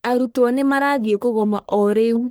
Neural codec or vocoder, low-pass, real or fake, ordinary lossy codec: codec, 44.1 kHz, 1.7 kbps, Pupu-Codec; none; fake; none